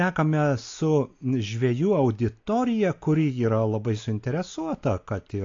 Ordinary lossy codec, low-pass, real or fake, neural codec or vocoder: AAC, 48 kbps; 7.2 kHz; real; none